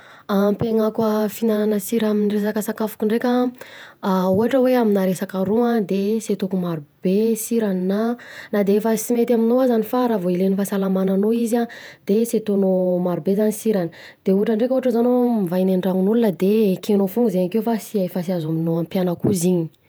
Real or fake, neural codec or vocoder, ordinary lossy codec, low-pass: fake; vocoder, 48 kHz, 128 mel bands, Vocos; none; none